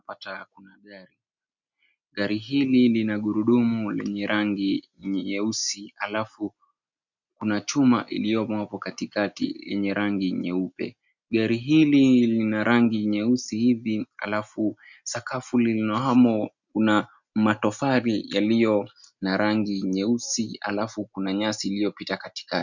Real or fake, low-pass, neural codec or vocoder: real; 7.2 kHz; none